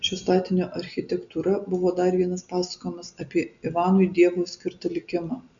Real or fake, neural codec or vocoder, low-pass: real; none; 7.2 kHz